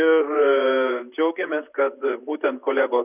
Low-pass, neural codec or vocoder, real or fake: 3.6 kHz; vocoder, 44.1 kHz, 128 mel bands, Pupu-Vocoder; fake